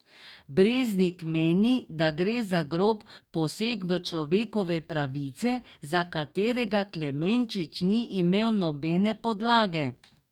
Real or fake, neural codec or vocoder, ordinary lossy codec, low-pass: fake; codec, 44.1 kHz, 2.6 kbps, DAC; none; 19.8 kHz